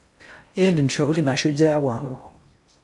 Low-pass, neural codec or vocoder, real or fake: 10.8 kHz; codec, 16 kHz in and 24 kHz out, 0.6 kbps, FocalCodec, streaming, 2048 codes; fake